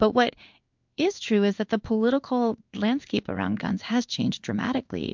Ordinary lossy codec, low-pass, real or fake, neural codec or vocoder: MP3, 64 kbps; 7.2 kHz; fake; codec, 16 kHz in and 24 kHz out, 1 kbps, XY-Tokenizer